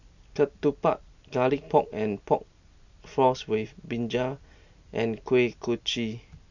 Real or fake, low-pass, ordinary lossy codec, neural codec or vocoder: real; 7.2 kHz; none; none